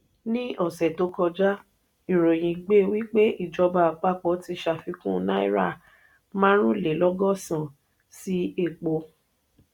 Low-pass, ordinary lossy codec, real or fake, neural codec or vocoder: 19.8 kHz; none; real; none